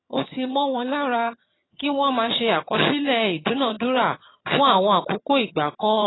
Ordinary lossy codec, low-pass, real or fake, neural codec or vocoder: AAC, 16 kbps; 7.2 kHz; fake; vocoder, 22.05 kHz, 80 mel bands, HiFi-GAN